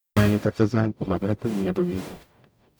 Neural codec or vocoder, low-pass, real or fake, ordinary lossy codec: codec, 44.1 kHz, 0.9 kbps, DAC; none; fake; none